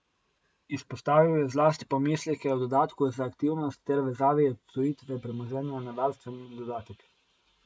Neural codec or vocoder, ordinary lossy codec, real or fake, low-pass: none; none; real; none